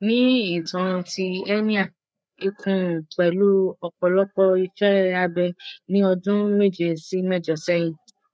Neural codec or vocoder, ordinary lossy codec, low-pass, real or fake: codec, 16 kHz, 4 kbps, FreqCodec, larger model; none; none; fake